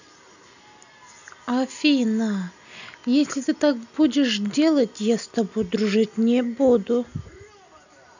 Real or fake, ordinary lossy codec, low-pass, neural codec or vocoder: real; none; 7.2 kHz; none